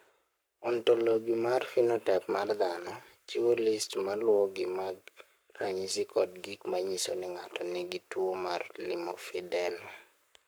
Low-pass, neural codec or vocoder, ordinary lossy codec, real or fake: none; codec, 44.1 kHz, 7.8 kbps, Pupu-Codec; none; fake